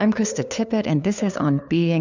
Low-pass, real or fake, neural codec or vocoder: 7.2 kHz; fake; codec, 16 kHz, 2 kbps, FunCodec, trained on LibriTTS, 25 frames a second